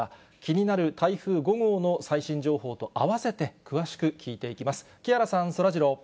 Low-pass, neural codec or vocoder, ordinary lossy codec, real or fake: none; none; none; real